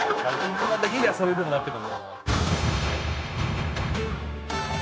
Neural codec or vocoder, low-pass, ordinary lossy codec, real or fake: codec, 16 kHz, 0.9 kbps, LongCat-Audio-Codec; none; none; fake